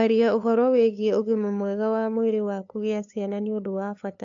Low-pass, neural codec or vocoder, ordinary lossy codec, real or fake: 7.2 kHz; codec, 16 kHz, 2 kbps, FunCodec, trained on LibriTTS, 25 frames a second; AAC, 64 kbps; fake